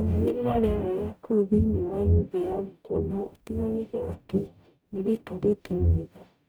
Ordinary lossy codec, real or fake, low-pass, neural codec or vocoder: none; fake; none; codec, 44.1 kHz, 0.9 kbps, DAC